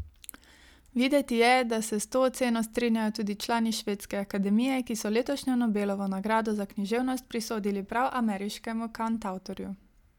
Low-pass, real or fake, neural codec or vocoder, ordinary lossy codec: 19.8 kHz; real; none; none